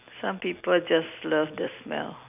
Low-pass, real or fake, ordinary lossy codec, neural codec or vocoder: 3.6 kHz; real; none; none